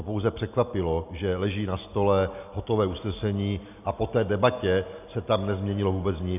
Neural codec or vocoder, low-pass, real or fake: none; 3.6 kHz; real